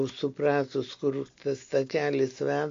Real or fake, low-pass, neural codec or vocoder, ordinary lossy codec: real; 7.2 kHz; none; AAC, 48 kbps